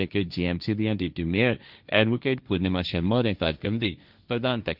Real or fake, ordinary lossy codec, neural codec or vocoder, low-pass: fake; Opus, 64 kbps; codec, 16 kHz, 1.1 kbps, Voila-Tokenizer; 5.4 kHz